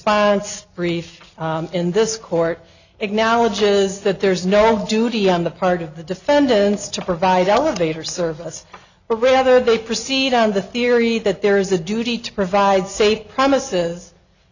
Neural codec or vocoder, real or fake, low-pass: none; real; 7.2 kHz